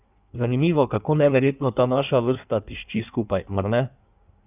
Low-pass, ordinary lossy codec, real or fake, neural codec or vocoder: 3.6 kHz; none; fake; codec, 16 kHz in and 24 kHz out, 1.1 kbps, FireRedTTS-2 codec